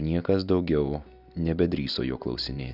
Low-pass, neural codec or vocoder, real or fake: 5.4 kHz; none; real